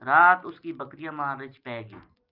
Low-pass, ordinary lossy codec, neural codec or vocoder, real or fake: 5.4 kHz; Opus, 24 kbps; none; real